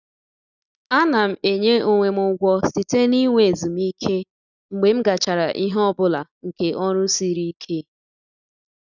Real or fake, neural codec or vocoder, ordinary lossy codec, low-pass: real; none; none; 7.2 kHz